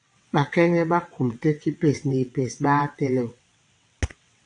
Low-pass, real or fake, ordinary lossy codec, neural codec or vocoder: 9.9 kHz; fake; AAC, 64 kbps; vocoder, 22.05 kHz, 80 mel bands, WaveNeXt